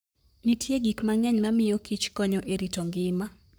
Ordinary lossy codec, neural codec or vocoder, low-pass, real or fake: none; codec, 44.1 kHz, 7.8 kbps, Pupu-Codec; none; fake